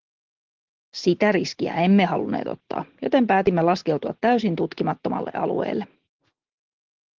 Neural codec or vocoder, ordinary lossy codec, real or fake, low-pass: none; Opus, 16 kbps; real; 7.2 kHz